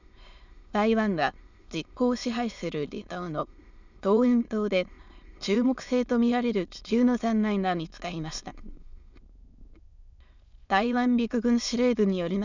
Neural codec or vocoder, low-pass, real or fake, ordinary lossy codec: autoencoder, 22.05 kHz, a latent of 192 numbers a frame, VITS, trained on many speakers; 7.2 kHz; fake; none